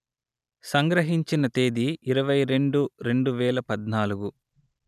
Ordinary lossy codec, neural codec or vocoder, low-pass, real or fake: none; none; 14.4 kHz; real